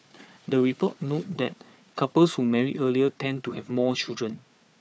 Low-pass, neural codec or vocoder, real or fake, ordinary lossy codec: none; codec, 16 kHz, 4 kbps, FunCodec, trained on Chinese and English, 50 frames a second; fake; none